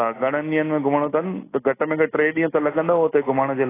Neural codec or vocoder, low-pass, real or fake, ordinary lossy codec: none; 3.6 kHz; real; AAC, 16 kbps